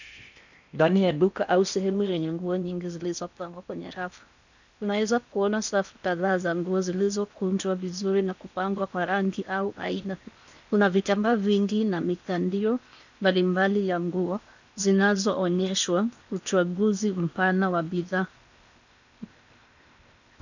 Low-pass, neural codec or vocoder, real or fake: 7.2 kHz; codec, 16 kHz in and 24 kHz out, 0.8 kbps, FocalCodec, streaming, 65536 codes; fake